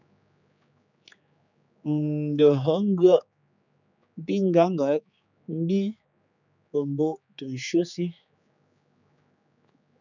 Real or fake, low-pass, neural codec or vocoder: fake; 7.2 kHz; codec, 16 kHz, 4 kbps, X-Codec, HuBERT features, trained on general audio